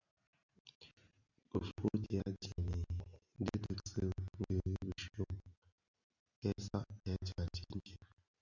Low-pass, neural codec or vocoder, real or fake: 7.2 kHz; none; real